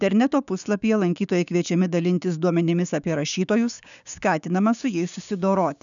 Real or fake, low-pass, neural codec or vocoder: fake; 7.2 kHz; codec, 16 kHz, 6 kbps, DAC